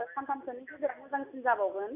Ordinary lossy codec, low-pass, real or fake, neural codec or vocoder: none; 3.6 kHz; real; none